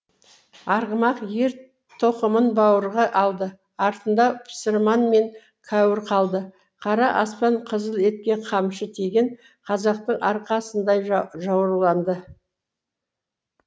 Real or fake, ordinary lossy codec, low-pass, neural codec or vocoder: real; none; none; none